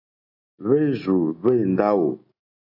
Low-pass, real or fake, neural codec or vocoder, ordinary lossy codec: 5.4 kHz; real; none; AAC, 32 kbps